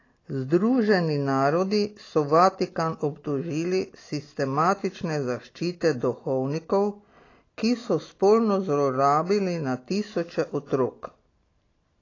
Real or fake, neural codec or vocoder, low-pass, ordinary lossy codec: real; none; 7.2 kHz; AAC, 32 kbps